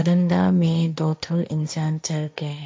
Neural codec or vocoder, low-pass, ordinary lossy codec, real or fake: codec, 16 kHz, 1.1 kbps, Voila-Tokenizer; none; none; fake